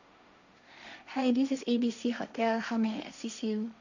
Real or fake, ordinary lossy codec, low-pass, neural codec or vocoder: fake; none; 7.2 kHz; codec, 16 kHz, 1.1 kbps, Voila-Tokenizer